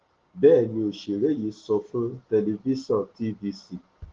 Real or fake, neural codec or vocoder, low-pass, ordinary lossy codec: real; none; 7.2 kHz; Opus, 16 kbps